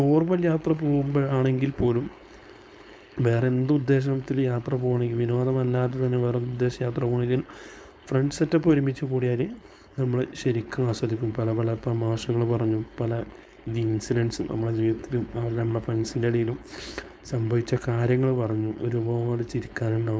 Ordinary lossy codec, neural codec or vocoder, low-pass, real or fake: none; codec, 16 kHz, 4.8 kbps, FACodec; none; fake